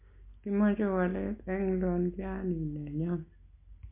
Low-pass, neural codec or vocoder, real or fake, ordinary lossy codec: 3.6 kHz; none; real; MP3, 24 kbps